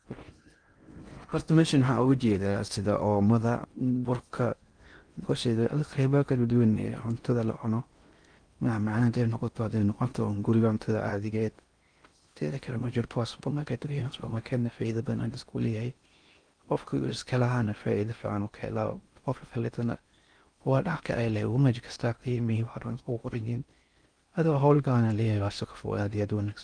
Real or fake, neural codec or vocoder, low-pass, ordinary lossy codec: fake; codec, 16 kHz in and 24 kHz out, 0.6 kbps, FocalCodec, streaming, 4096 codes; 9.9 kHz; Opus, 32 kbps